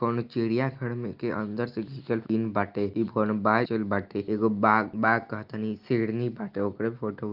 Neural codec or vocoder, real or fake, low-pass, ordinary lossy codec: none; real; 5.4 kHz; Opus, 32 kbps